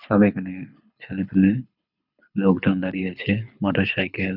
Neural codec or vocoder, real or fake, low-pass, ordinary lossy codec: codec, 24 kHz, 6 kbps, HILCodec; fake; 5.4 kHz; none